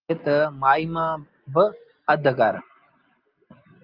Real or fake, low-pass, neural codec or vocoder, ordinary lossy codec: real; 5.4 kHz; none; Opus, 24 kbps